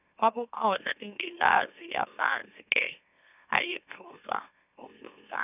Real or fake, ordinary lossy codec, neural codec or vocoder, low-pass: fake; none; autoencoder, 44.1 kHz, a latent of 192 numbers a frame, MeloTTS; 3.6 kHz